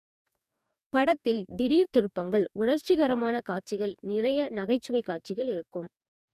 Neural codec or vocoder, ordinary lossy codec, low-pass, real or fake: codec, 44.1 kHz, 2.6 kbps, DAC; none; 14.4 kHz; fake